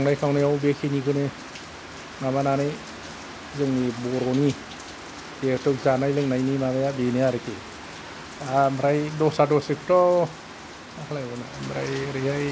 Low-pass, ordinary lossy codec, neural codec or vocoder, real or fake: none; none; none; real